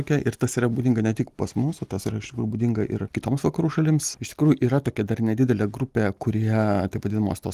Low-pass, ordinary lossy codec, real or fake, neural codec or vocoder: 14.4 kHz; Opus, 24 kbps; real; none